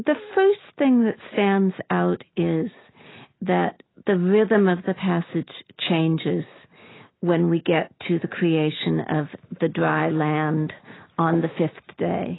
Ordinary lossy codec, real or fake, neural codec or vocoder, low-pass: AAC, 16 kbps; real; none; 7.2 kHz